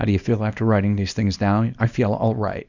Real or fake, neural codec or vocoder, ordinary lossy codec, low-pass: fake; codec, 24 kHz, 0.9 kbps, WavTokenizer, small release; Opus, 64 kbps; 7.2 kHz